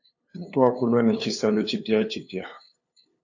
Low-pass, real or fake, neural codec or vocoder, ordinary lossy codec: 7.2 kHz; fake; codec, 16 kHz, 2 kbps, FunCodec, trained on LibriTTS, 25 frames a second; AAC, 48 kbps